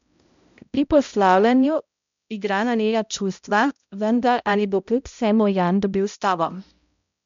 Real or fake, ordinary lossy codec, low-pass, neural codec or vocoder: fake; MP3, 64 kbps; 7.2 kHz; codec, 16 kHz, 0.5 kbps, X-Codec, HuBERT features, trained on balanced general audio